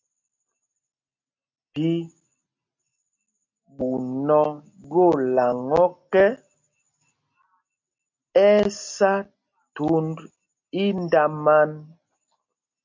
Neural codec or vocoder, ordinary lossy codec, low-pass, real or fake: none; MP3, 48 kbps; 7.2 kHz; real